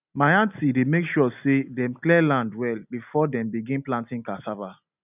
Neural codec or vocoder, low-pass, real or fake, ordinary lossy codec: none; 3.6 kHz; real; none